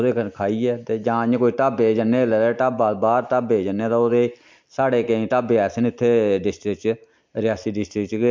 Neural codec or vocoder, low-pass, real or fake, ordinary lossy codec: none; 7.2 kHz; real; MP3, 64 kbps